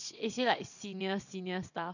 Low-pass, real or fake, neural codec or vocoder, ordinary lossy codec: 7.2 kHz; fake; vocoder, 22.05 kHz, 80 mel bands, WaveNeXt; none